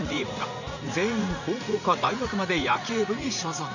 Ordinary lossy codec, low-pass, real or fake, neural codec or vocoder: none; 7.2 kHz; fake; vocoder, 44.1 kHz, 80 mel bands, Vocos